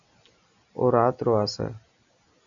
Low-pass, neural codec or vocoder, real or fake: 7.2 kHz; none; real